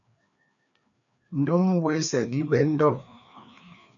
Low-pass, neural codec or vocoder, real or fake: 7.2 kHz; codec, 16 kHz, 2 kbps, FreqCodec, larger model; fake